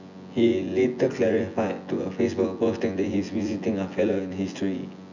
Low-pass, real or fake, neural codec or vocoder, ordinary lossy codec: 7.2 kHz; fake; vocoder, 24 kHz, 100 mel bands, Vocos; none